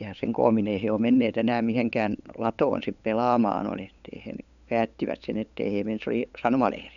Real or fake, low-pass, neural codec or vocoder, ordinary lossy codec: fake; 7.2 kHz; codec, 16 kHz, 8 kbps, FunCodec, trained on LibriTTS, 25 frames a second; none